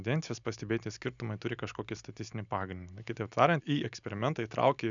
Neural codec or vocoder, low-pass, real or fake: none; 7.2 kHz; real